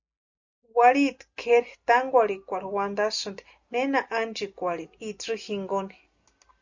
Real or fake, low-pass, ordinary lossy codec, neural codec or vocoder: real; 7.2 kHz; Opus, 64 kbps; none